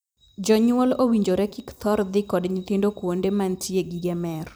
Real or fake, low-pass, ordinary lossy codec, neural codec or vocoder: fake; none; none; vocoder, 44.1 kHz, 128 mel bands every 256 samples, BigVGAN v2